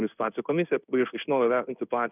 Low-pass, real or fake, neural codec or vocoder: 3.6 kHz; fake; codec, 16 kHz in and 24 kHz out, 1 kbps, XY-Tokenizer